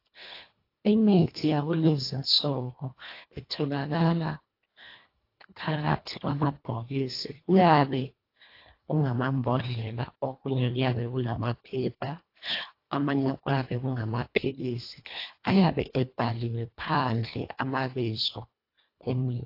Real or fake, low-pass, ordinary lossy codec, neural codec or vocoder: fake; 5.4 kHz; AAC, 32 kbps; codec, 24 kHz, 1.5 kbps, HILCodec